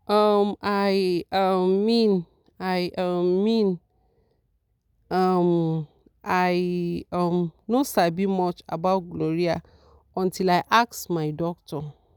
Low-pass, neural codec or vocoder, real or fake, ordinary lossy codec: 19.8 kHz; none; real; none